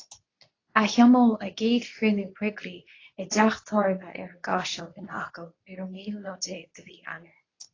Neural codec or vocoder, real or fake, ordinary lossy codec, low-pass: codec, 24 kHz, 0.9 kbps, WavTokenizer, medium speech release version 2; fake; AAC, 32 kbps; 7.2 kHz